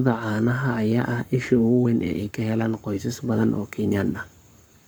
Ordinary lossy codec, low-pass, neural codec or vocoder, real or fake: none; none; codec, 44.1 kHz, 7.8 kbps, Pupu-Codec; fake